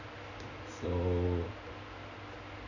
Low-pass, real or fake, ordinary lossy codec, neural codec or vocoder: 7.2 kHz; real; none; none